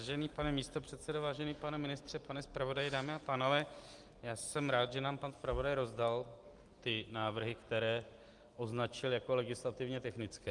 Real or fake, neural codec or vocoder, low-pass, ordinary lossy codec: real; none; 10.8 kHz; Opus, 32 kbps